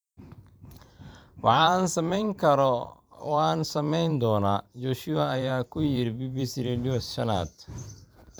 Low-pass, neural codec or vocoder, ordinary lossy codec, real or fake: none; vocoder, 44.1 kHz, 128 mel bands every 512 samples, BigVGAN v2; none; fake